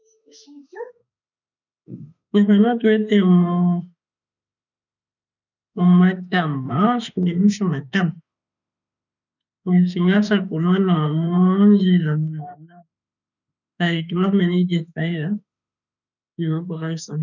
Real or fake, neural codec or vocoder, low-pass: fake; autoencoder, 48 kHz, 32 numbers a frame, DAC-VAE, trained on Japanese speech; 7.2 kHz